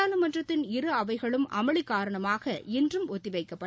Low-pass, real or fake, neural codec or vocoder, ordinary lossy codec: none; real; none; none